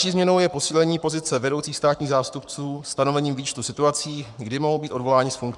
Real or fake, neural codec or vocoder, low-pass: fake; codec, 44.1 kHz, 7.8 kbps, Pupu-Codec; 10.8 kHz